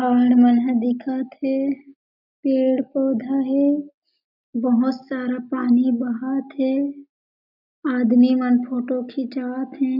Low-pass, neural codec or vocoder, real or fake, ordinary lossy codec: 5.4 kHz; none; real; none